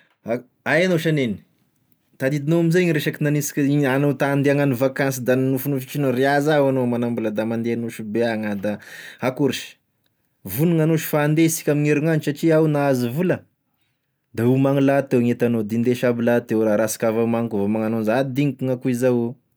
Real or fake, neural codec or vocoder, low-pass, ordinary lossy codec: real; none; none; none